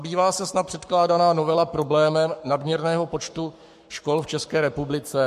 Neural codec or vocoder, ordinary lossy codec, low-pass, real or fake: codec, 44.1 kHz, 7.8 kbps, Pupu-Codec; MP3, 64 kbps; 14.4 kHz; fake